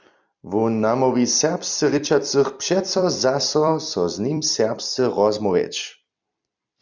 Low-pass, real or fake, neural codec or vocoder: 7.2 kHz; fake; vocoder, 44.1 kHz, 128 mel bands every 256 samples, BigVGAN v2